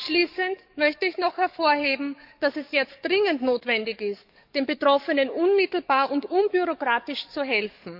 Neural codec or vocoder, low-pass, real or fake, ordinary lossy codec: codec, 44.1 kHz, 7.8 kbps, DAC; 5.4 kHz; fake; none